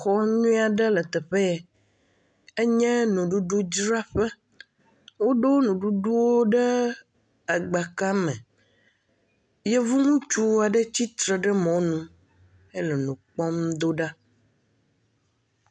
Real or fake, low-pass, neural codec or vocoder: real; 9.9 kHz; none